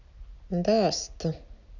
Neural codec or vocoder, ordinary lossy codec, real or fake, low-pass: none; none; real; 7.2 kHz